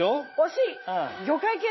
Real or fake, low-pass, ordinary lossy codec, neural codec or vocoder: fake; 7.2 kHz; MP3, 24 kbps; vocoder, 44.1 kHz, 80 mel bands, Vocos